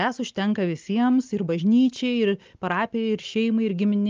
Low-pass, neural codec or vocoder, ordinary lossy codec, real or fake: 7.2 kHz; none; Opus, 24 kbps; real